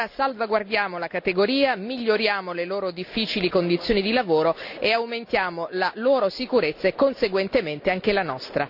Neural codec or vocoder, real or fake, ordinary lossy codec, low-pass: none; real; none; 5.4 kHz